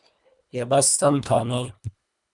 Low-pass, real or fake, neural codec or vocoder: 10.8 kHz; fake; codec, 24 kHz, 1.5 kbps, HILCodec